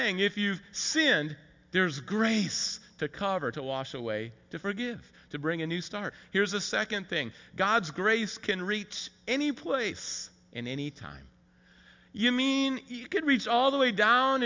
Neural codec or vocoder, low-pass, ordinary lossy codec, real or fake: none; 7.2 kHz; MP3, 64 kbps; real